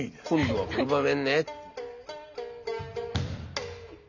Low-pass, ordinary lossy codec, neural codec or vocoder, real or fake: 7.2 kHz; none; none; real